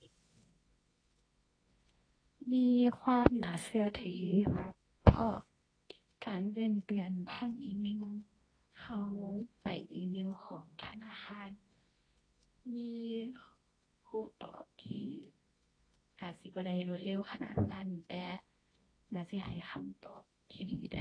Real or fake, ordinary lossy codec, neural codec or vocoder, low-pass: fake; AAC, 32 kbps; codec, 24 kHz, 0.9 kbps, WavTokenizer, medium music audio release; 9.9 kHz